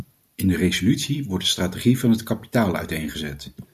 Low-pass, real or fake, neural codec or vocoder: 14.4 kHz; real; none